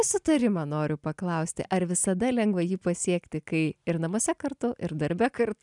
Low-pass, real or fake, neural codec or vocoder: 10.8 kHz; real; none